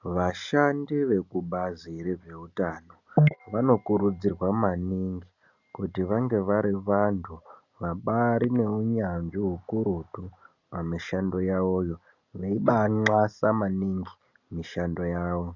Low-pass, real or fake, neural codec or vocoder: 7.2 kHz; real; none